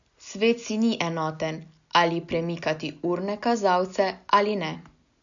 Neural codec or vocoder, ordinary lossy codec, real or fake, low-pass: none; none; real; 7.2 kHz